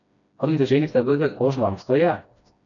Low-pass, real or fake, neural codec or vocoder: 7.2 kHz; fake; codec, 16 kHz, 1 kbps, FreqCodec, smaller model